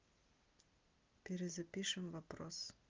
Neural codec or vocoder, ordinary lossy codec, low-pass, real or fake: none; Opus, 32 kbps; 7.2 kHz; real